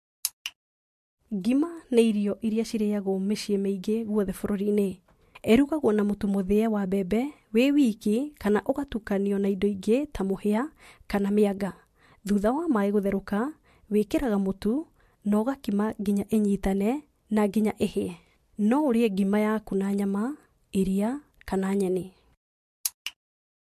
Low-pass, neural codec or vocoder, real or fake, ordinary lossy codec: 14.4 kHz; none; real; MP3, 64 kbps